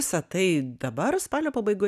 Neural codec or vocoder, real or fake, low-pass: none; real; 14.4 kHz